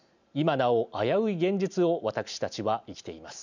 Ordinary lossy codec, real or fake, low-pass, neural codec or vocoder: none; real; 7.2 kHz; none